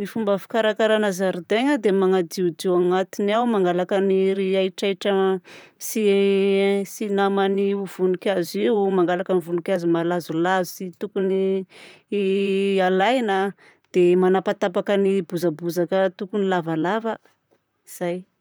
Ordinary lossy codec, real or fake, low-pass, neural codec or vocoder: none; fake; none; vocoder, 44.1 kHz, 128 mel bands, Pupu-Vocoder